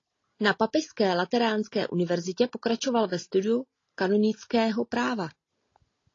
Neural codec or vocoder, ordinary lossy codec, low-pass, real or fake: none; AAC, 32 kbps; 7.2 kHz; real